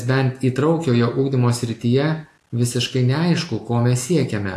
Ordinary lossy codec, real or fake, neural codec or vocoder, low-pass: AAC, 64 kbps; real; none; 14.4 kHz